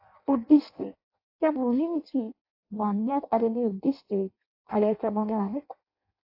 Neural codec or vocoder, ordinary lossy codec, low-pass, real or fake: codec, 16 kHz in and 24 kHz out, 0.6 kbps, FireRedTTS-2 codec; AAC, 32 kbps; 5.4 kHz; fake